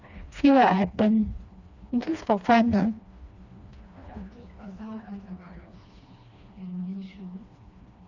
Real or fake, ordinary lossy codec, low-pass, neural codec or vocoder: fake; Opus, 64 kbps; 7.2 kHz; codec, 16 kHz, 2 kbps, FreqCodec, smaller model